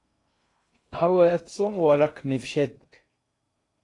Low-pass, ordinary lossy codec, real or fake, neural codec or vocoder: 10.8 kHz; AAC, 48 kbps; fake; codec, 16 kHz in and 24 kHz out, 0.6 kbps, FocalCodec, streaming, 2048 codes